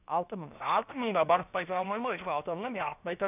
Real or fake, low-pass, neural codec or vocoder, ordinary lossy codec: fake; 3.6 kHz; codec, 16 kHz, 0.7 kbps, FocalCodec; none